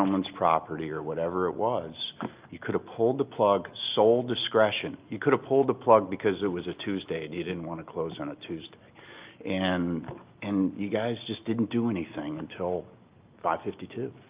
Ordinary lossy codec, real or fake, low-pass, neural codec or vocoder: Opus, 24 kbps; real; 3.6 kHz; none